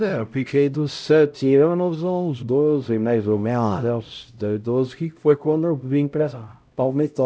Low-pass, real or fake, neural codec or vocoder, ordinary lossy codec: none; fake; codec, 16 kHz, 0.5 kbps, X-Codec, HuBERT features, trained on LibriSpeech; none